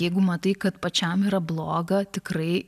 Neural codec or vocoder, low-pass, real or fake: none; 14.4 kHz; real